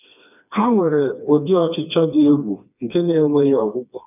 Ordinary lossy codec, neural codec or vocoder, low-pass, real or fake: none; codec, 16 kHz, 2 kbps, FreqCodec, smaller model; 3.6 kHz; fake